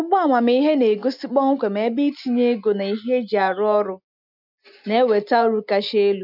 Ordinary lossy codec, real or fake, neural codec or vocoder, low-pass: none; real; none; 5.4 kHz